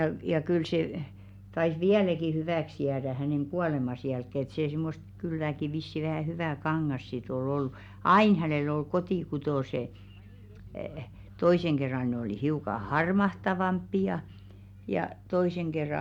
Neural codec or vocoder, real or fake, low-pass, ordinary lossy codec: none; real; 19.8 kHz; none